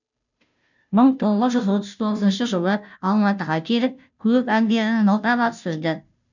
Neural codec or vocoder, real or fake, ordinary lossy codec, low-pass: codec, 16 kHz, 0.5 kbps, FunCodec, trained on Chinese and English, 25 frames a second; fake; none; 7.2 kHz